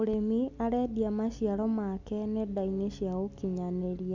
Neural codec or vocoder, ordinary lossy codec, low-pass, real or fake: none; none; 7.2 kHz; real